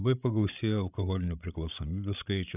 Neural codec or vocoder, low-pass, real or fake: codec, 16 kHz, 16 kbps, FreqCodec, larger model; 3.6 kHz; fake